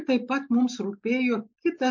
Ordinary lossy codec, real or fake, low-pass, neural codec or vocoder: MP3, 48 kbps; real; 7.2 kHz; none